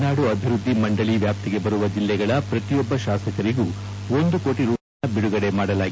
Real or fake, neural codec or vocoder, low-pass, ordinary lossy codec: real; none; none; none